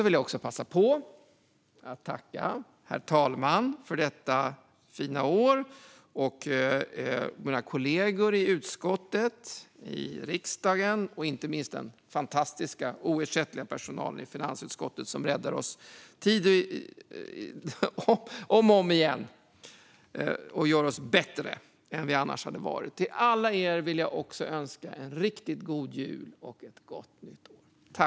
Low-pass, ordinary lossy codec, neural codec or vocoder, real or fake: none; none; none; real